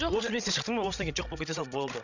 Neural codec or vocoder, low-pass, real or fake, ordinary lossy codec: codec, 16 kHz, 16 kbps, FreqCodec, larger model; 7.2 kHz; fake; none